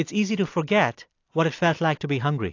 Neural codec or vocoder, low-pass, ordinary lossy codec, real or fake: none; 7.2 kHz; AAC, 48 kbps; real